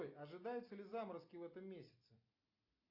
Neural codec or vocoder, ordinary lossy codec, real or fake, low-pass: none; AAC, 48 kbps; real; 5.4 kHz